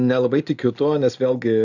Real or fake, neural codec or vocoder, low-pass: real; none; 7.2 kHz